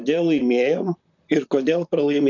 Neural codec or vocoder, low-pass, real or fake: vocoder, 44.1 kHz, 128 mel bands, Pupu-Vocoder; 7.2 kHz; fake